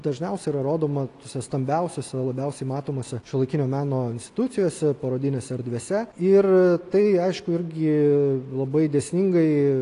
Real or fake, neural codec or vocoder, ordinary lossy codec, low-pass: real; none; AAC, 48 kbps; 10.8 kHz